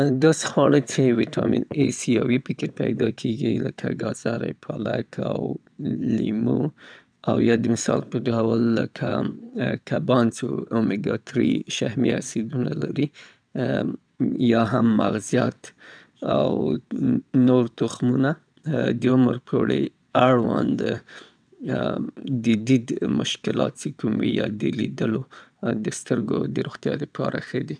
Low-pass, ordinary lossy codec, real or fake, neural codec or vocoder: 9.9 kHz; none; fake; vocoder, 22.05 kHz, 80 mel bands, WaveNeXt